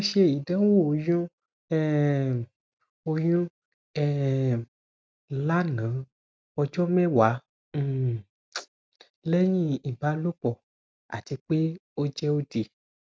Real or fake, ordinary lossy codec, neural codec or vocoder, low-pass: real; none; none; none